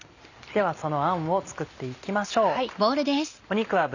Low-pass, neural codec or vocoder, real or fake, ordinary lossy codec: 7.2 kHz; none; real; none